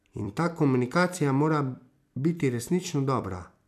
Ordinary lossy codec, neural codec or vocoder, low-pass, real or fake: none; vocoder, 44.1 kHz, 128 mel bands every 256 samples, BigVGAN v2; 14.4 kHz; fake